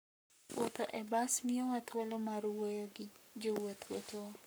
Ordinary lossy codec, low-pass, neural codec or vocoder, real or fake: none; none; codec, 44.1 kHz, 7.8 kbps, Pupu-Codec; fake